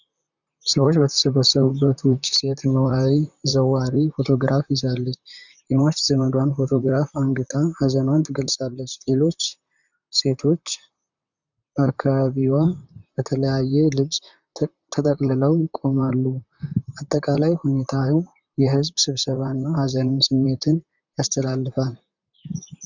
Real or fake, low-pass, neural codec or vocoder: fake; 7.2 kHz; vocoder, 44.1 kHz, 128 mel bands, Pupu-Vocoder